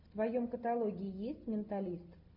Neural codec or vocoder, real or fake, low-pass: none; real; 5.4 kHz